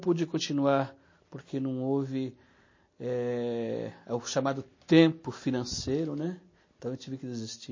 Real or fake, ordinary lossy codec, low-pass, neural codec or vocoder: real; MP3, 32 kbps; 7.2 kHz; none